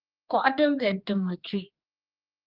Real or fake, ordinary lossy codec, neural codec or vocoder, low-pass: fake; Opus, 24 kbps; codec, 16 kHz, 2 kbps, X-Codec, HuBERT features, trained on general audio; 5.4 kHz